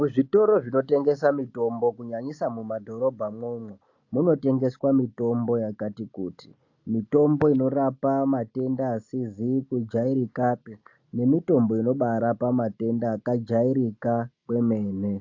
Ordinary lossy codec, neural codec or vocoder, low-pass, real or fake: AAC, 48 kbps; none; 7.2 kHz; real